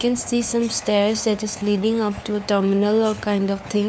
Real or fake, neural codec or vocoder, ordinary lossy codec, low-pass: fake; codec, 16 kHz, 4 kbps, FunCodec, trained on LibriTTS, 50 frames a second; none; none